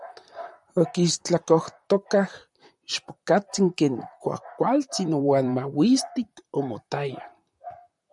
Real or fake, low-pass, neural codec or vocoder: fake; 10.8 kHz; vocoder, 44.1 kHz, 128 mel bands, Pupu-Vocoder